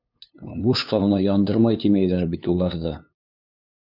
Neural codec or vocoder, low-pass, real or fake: codec, 16 kHz, 2 kbps, FunCodec, trained on LibriTTS, 25 frames a second; 5.4 kHz; fake